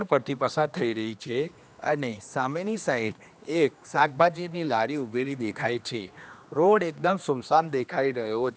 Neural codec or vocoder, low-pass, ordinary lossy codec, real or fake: codec, 16 kHz, 2 kbps, X-Codec, HuBERT features, trained on general audio; none; none; fake